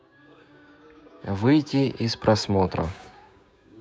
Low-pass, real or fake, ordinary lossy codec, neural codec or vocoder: none; fake; none; codec, 16 kHz, 6 kbps, DAC